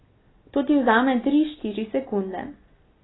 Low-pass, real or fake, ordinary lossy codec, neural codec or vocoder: 7.2 kHz; real; AAC, 16 kbps; none